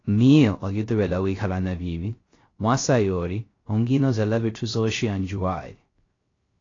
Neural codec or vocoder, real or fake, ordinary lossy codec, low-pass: codec, 16 kHz, 0.3 kbps, FocalCodec; fake; AAC, 32 kbps; 7.2 kHz